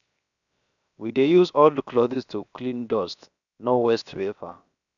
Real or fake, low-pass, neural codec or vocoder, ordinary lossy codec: fake; 7.2 kHz; codec, 16 kHz, 0.7 kbps, FocalCodec; none